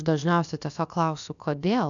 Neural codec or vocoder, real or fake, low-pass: codec, 16 kHz, about 1 kbps, DyCAST, with the encoder's durations; fake; 7.2 kHz